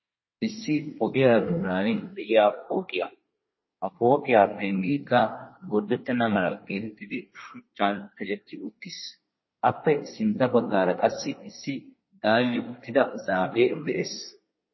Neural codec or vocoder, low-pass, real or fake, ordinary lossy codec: codec, 24 kHz, 1 kbps, SNAC; 7.2 kHz; fake; MP3, 24 kbps